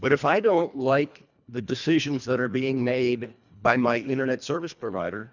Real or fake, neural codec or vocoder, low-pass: fake; codec, 24 kHz, 1.5 kbps, HILCodec; 7.2 kHz